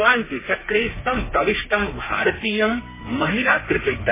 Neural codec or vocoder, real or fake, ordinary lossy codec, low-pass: codec, 32 kHz, 1.9 kbps, SNAC; fake; MP3, 16 kbps; 3.6 kHz